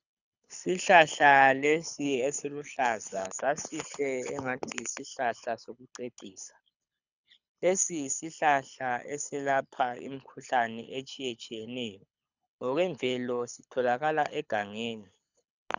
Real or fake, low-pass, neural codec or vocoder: fake; 7.2 kHz; codec, 24 kHz, 6 kbps, HILCodec